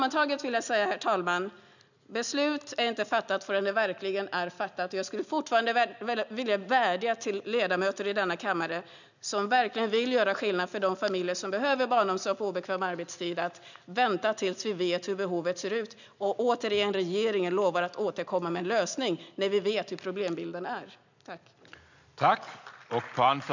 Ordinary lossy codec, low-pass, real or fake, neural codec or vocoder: none; 7.2 kHz; real; none